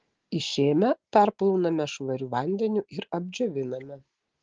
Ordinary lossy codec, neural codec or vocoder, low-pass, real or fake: Opus, 16 kbps; none; 7.2 kHz; real